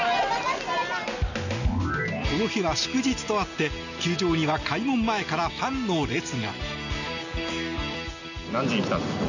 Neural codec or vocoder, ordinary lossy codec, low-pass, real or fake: none; none; 7.2 kHz; real